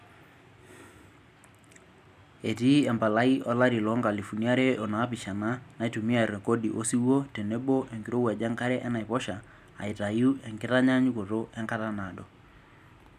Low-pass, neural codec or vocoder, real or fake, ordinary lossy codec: 14.4 kHz; none; real; none